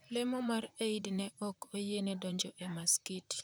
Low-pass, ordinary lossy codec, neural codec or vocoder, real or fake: none; none; vocoder, 44.1 kHz, 128 mel bands, Pupu-Vocoder; fake